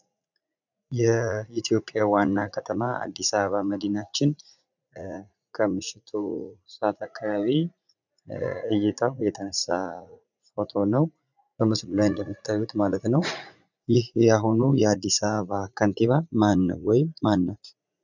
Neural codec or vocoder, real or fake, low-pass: vocoder, 44.1 kHz, 80 mel bands, Vocos; fake; 7.2 kHz